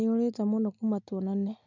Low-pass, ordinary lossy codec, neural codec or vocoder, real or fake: 7.2 kHz; none; none; real